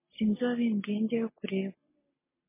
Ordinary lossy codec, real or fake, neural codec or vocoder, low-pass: MP3, 16 kbps; real; none; 3.6 kHz